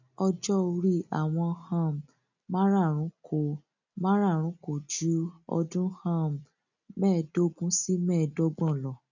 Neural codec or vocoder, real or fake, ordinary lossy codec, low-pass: none; real; none; 7.2 kHz